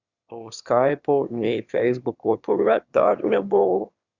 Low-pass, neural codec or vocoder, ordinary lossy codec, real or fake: 7.2 kHz; autoencoder, 22.05 kHz, a latent of 192 numbers a frame, VITS, trained on one speaker; Opus, 64 kbps; fake